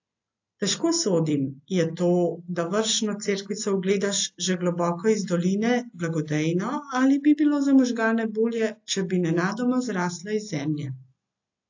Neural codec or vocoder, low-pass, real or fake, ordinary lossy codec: none; 7.2 kHz; real; AAC, 48 kbps